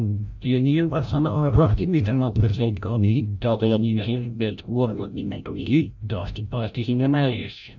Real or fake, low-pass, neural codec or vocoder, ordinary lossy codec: fake; 7.2 kHz; codec, 16 kHz, 0.5 kbps, FreqCodec, larger model; none